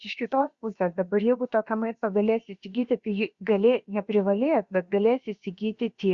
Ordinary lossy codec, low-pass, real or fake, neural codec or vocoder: Opus, 64 kbps; 7.2 kHz; fake; codec, 16 kHz, about 1 kbps, DyCAST, with the encoder's durations